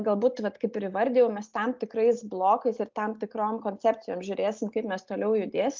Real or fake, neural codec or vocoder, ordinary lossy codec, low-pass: real; none; Opus, 32 kbps; 7.2 kHz